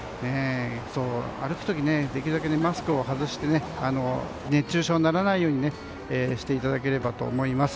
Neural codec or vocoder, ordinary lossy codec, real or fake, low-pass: none; none; real; none